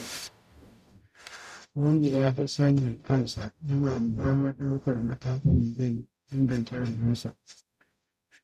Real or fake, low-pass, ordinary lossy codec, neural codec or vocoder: fake; 14.4 kHz; none; codec, 44.1 kHz, 0.9 kbps, DAC